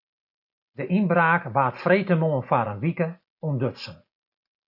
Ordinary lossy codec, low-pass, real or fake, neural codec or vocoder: AAC, 48 kbps; 5.4 kHz; real; none